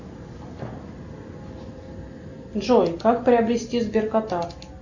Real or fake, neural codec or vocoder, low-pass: real; none; 7.2 kHz